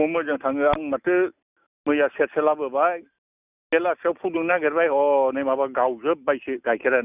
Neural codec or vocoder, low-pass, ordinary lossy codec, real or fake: none; 3.6 kHz; none; real